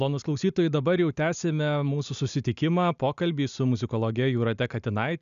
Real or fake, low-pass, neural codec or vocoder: real; 7.2 kHz; none